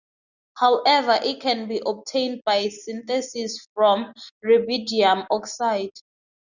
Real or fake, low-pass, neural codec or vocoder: real; 7.2 kHz; none